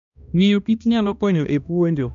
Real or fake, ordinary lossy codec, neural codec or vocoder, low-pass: fake; none; codec, 16 kHz, 1 kbps, X-Codec, HuBERT features, trained on balanced general audio; 7.2 kHz